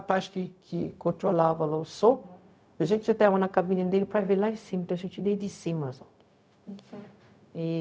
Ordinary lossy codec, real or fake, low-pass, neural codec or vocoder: none; fake; none; codec, 16 kHz, 0.4 kbps, LongCat-Audio-Codec